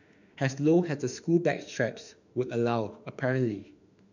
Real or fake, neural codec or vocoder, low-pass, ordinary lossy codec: fake; autoencoder, 48 kHz, 32 numbers a frame, DAC-VAE, trained on Japanese speech; 7.2 kHz; none